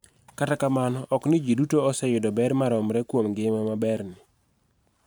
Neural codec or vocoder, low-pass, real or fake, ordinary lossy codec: vocoder, 44.1 kHz, 128 mel bands every 512 samples, BigVGAN v2; none; fake; none